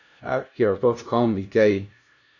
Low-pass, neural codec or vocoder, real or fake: 7.2 kHz; codec, 16 kHz, 0.5 kbps, FunCodec, trained on LibriTTS, 25 frames a second; fake